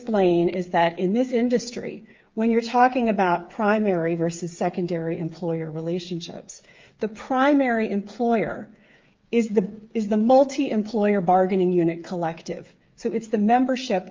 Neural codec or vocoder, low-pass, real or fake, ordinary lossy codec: codec, 16 kHz, 8 kbps, FreqCodec, smaller model; 7.2 kHz; fake; Opus, 24 kbps